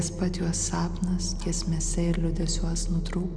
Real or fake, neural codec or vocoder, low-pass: real; none; 9.9 kHz